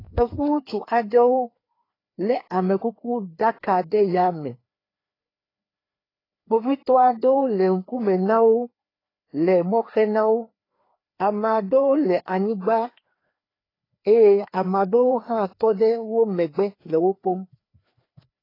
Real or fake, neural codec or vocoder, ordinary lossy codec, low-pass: fake; codec, 16 kHz, 2 kbps, FreqCodec, larger model; AAC, 24 kbps; 5.4 kHz